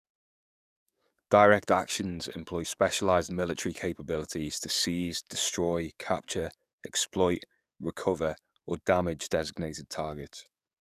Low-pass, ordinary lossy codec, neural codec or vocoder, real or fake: 14.4 kHz; none; codec, 44.1 kHz, 7.8 kbps, DAC; fake